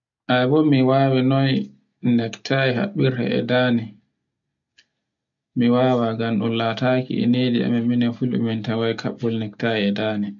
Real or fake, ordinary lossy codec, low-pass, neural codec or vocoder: real; none; 7.2 kHz; none